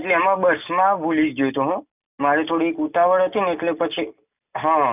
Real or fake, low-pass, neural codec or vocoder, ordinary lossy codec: real; 3.6 kHz; none; none